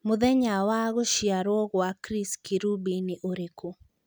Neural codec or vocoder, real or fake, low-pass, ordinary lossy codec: none; real; none; none